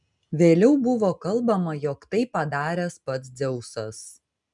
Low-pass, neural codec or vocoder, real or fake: 10.8 kHz; none; real